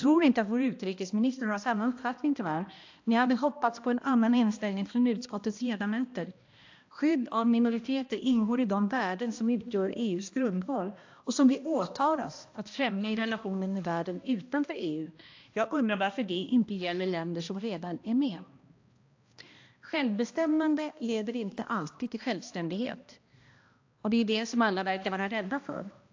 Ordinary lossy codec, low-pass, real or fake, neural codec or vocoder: AAC, 48 kbps; 7.2 kHz; fake; codec, 16 kHz, 1 kbps, X-Codec, HuBERT features, trained on balanced general audio